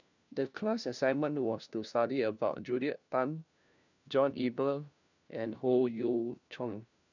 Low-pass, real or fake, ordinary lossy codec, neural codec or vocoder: 7.2 kHz; fake; none; codec, 16 kHz, 1 kbps, FunCodec, trained on LibriTTS, 50 frames a second